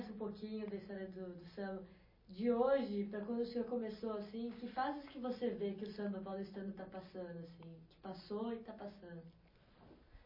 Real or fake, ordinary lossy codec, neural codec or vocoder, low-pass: real; MP3, 24 kbps; none; 5.4 kHz